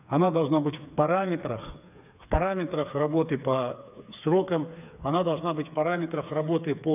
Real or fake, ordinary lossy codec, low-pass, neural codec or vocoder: fake; none; 3.6 kHz; codec, 16 kHz, 4 kbps, FreqCodec, smaller model